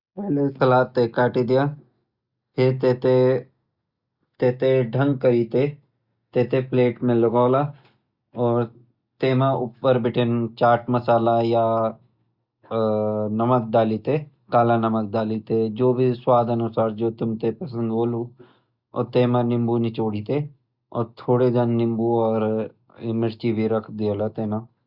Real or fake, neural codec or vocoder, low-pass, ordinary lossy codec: real; none; 5.4 kHz; Opus, 64 kbps